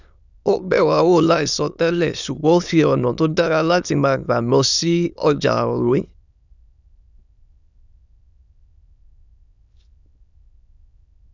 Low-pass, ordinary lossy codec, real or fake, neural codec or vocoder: 7.2 kHz; none; fake; autoencoder, 22.05 kHz, a latent of 192 numbers a frame, VITS, trained on many speakers